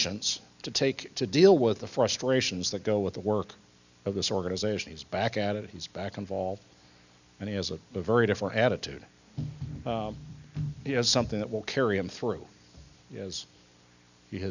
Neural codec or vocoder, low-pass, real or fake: none; 7.2 kHz; real